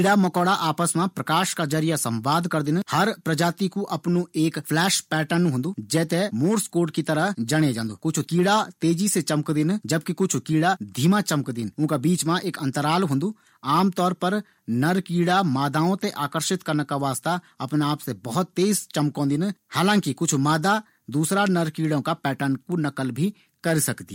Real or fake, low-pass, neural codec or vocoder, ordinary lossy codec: real; 19.8 kHz; none; MP3, 64 kbps